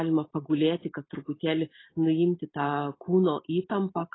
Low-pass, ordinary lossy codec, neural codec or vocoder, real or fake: 7.2 kHz; AAC, 16 kbps; none; real